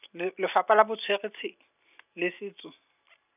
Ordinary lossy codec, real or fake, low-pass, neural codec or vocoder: none; real; 3.6 kHz; none